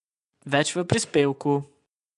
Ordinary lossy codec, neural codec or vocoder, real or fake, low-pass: AAC, 96 kbps; vocoder, 24 kHz, 100 mel bands, Vocos; fake; 10.8 kHz